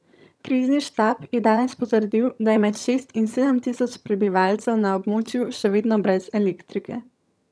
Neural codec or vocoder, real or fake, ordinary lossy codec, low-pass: vocoder, 22.05 kHz, 80 mel bands, HiFi-GAN; fake; none; none